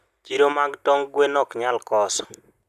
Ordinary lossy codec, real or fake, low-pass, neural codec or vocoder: none; real; 14.4 kHz; none